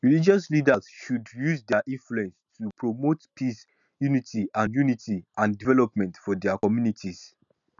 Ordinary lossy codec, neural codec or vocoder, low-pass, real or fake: none; none; 7.2 kHz; real